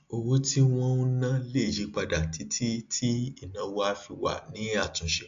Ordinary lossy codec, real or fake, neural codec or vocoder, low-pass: AAC, 64 kbps; real; none; 7.2 kHz